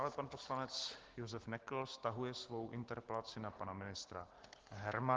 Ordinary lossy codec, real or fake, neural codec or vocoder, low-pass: Opus, 16 kbps; real; none; 7.2 kHz